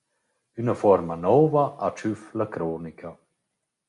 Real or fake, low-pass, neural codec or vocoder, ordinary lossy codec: real; 10.8 kHz; none; MP3, 96 kbps